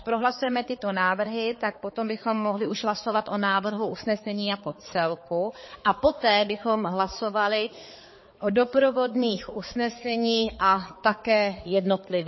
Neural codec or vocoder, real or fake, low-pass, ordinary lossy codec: codec, 16 kHz, 4 kbps, X-Codec, HuBERT features, trained on balanced general audio; fake; 7.2 kHz; MP3, 24 kbps